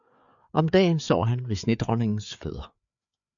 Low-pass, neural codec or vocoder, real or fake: 7.2 kHz; codec, 16 kHz, 4 kbps, FreqCodec, larger model; fake